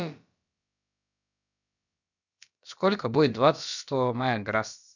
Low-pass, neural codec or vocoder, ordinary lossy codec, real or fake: 7.2 kHz; codec, 16 kHz, about 1 kbps, DyCAST, with the encoder's durations; none; fake